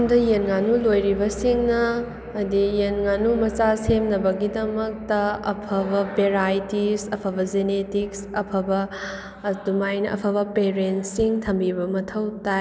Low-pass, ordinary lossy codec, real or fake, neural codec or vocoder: none; none; real; none